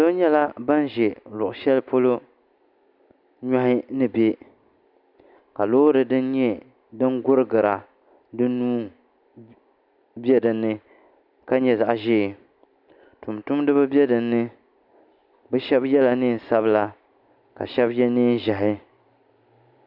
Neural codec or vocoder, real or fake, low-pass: none; real; 5.4 kHz